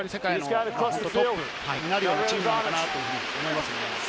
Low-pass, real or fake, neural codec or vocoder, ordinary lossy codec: none; real; none; none